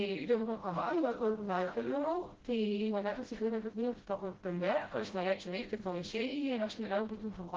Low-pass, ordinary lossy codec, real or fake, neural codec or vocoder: 7.2 kHz; Opus, 16 kbps; fake; codec, 16 kHz, 0.5 kbps, FreqCodec, smaller model